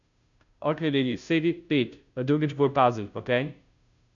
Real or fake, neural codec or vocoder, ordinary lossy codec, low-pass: fake; codec, 16 kHz, 0.5 kbps, FunCodec, trained on Chinese and English, 25 frames a second; none; 7.2 kHz